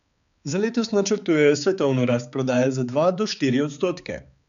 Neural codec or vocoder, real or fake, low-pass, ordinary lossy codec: codec, 16 kHz, 4 kbps, X-Codec, HuBERT features, trained on balanced general audio; fake; 7.2 kHz; none